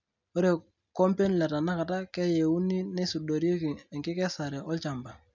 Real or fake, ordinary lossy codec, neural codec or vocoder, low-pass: real; none; none; 7.2 kHz